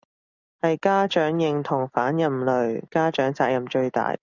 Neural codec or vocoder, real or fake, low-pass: none; real; 7.2 kHz